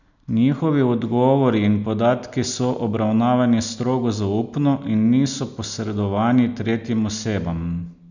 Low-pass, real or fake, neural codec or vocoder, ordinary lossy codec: 7.2 kHz; real; none; none